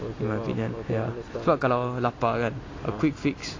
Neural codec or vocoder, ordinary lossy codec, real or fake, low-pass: none; AAC, 32 kbps; real; 7.2 kHz